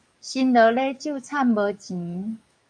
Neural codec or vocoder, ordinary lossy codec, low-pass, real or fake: codec, 44.1 kHz, 7.8 kbps, DAC; MP3, 96 kbps; 9.9 kHz; fake